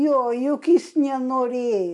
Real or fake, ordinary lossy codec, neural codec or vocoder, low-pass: real; MP3, 64 kbps; none; 10.8 kHz